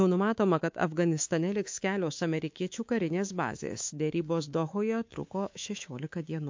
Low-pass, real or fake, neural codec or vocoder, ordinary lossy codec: 7.2 kHz; fake; codec, 24 kHz, 3.1 kbps, DualCodec; MP3, 48 kbps